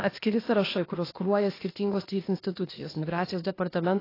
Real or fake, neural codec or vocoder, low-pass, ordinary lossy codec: fake; codec, 16 kHz, 0.8 kbps, ZipCodec; 5.4 kHz; AAC, 24 kbps